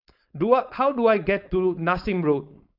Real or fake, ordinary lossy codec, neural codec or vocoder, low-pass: fake; none; codec, 16 kHz, 4.8 kbps, FACodec; 5.4 kHz